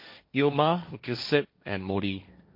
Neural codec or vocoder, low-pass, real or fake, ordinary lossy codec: codec, 16 kHz, 1.1 kbps, Voila-Tokenizer; 5.4 kHz; fake; MP3, 32 kbps